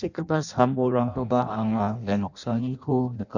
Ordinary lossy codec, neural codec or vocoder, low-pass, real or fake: none; codec, 16 kHz in and 24 kHz out, 0.6 kbps, FireRedTTS-2 codec; 7.2 kHz; fake